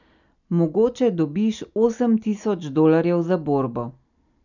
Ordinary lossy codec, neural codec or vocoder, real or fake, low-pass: none; none; real; 7.2 kHz